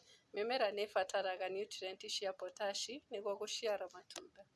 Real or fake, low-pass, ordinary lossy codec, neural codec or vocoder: real; none; none; none